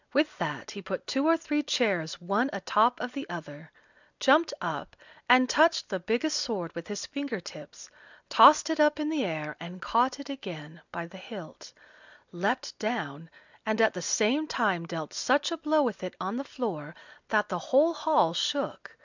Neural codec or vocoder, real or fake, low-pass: none; real; 7.2 kHz